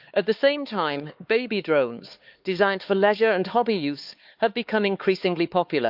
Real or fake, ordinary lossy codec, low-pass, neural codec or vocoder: fake; Opus, 32 kbps; 5.4 kHz; codec, 16 kHz, 4 kbps, X-Codec, HuBERT features, trained on LibriSpeech